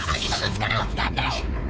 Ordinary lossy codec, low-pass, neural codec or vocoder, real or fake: none; none; codec, 16 kHz, 4 kbps, X-Codec, WavLM features, trained on Multilingual LibriSpeech; fake